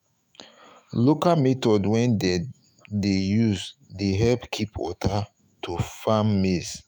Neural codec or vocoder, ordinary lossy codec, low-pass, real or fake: autoencoder, 48 kHz, 128 numbers a frame, DAC-VAE, trained on Japanese speech; none; none; fake